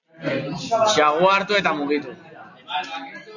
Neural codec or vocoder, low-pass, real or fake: none; 7.2 kHz; real